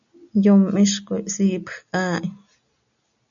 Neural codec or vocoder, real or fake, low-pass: none; real; 7.2 kHz